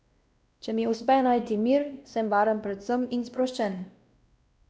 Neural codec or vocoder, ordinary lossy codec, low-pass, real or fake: codec, 16 kHz, 1 kbps, X-Codec, WavLM features, trained on Multilingual LibriSpeech; none; none; fake